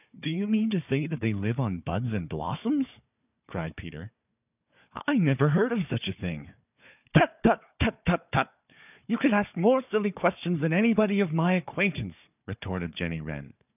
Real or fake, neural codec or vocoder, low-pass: fake; codec, 16 kHz, 4 kbps, FunCodec, trained on Chinese and English, 50 frames a second; 3.6 kHz